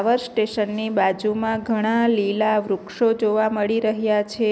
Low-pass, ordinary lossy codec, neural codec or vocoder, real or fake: none; none; none; real